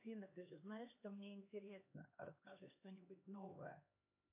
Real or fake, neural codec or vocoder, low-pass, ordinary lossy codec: fake; codec, 16 kHz, 2 kbps, X-Codec, HuBERT features, trained on LibriSpeech; 3.6 kHz; AAC, 32 kbps